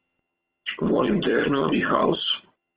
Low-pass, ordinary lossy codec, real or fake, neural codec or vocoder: 3.6 kHz; Opus, 16 kbps; fake; vocoder, 22.05 kHz, 80 mel bands, HiFi-GAN